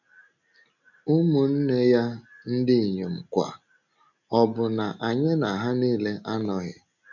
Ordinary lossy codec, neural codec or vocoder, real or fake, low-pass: none; none; real; 7.2 kHz